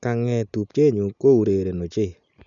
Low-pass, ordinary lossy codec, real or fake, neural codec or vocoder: 7.2 kHz; none; real; none